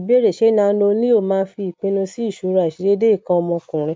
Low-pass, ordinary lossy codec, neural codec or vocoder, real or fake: none; none; none; real